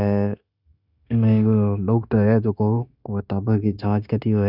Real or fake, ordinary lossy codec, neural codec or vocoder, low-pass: fake; none; codec, 16 kHz, 0.9 kbps, LongCat-Audio-Codec; 5.4 kHz